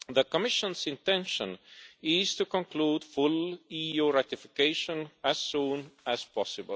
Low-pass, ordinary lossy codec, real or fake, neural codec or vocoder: none; none; real; none